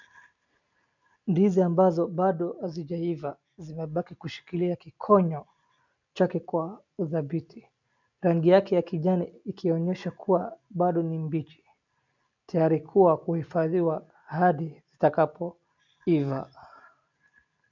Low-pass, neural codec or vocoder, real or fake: 7.2 kHz; none; real